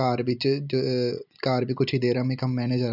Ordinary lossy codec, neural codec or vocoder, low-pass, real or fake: none; none; 5.4 kHz; real